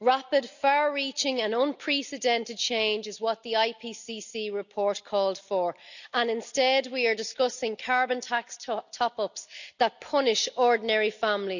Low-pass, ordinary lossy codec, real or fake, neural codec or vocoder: 7.2 kHz; none; real; none